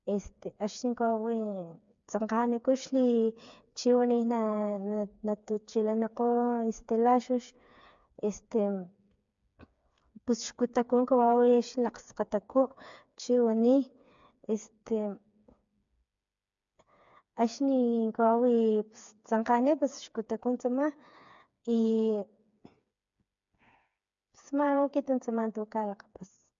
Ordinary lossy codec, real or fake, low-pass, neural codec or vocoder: MP3, 64 kbps; fake; 7.2 kHz; codec, 16 kHz, 4 kbps, FreqCodec, smaller model